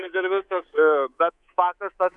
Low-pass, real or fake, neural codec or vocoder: 10.8 kHz; fake; codec, 24 kHz, 3.1 kbps, DualCodec